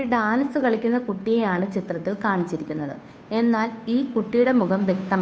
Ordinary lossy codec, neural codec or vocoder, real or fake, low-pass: none; codec, 16 kHz, 2 kbps, FunCodec, trained on Chinese and English, 25 frames a second; fake; none